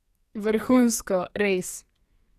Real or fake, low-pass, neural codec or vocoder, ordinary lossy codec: fake; 14.4 kHz; codec, 44.1 kHz, 2.6 kbps, SNAC; Opus, 64 kbps